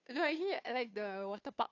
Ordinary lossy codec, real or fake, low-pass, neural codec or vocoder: AAC, 48 kbps; fake; 7.2 kHz; codec, 16 kHz, 2 kbps, FunCodec, trained on Chinese and English, 25 frames a second